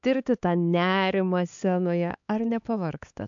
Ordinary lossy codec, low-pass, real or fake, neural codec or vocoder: AAC, 64 kbps; 7.2 kHz; fake; codec, 16 kHz, 4 kbps, X-Codec, HuBERT features, trained on LibriSpeech